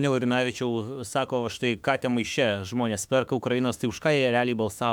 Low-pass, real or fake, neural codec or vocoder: 19.8 kHz; fake; autoencoder, 48 kHz, 32 numbers a frame, DAC-VAE, trained on Japanese speech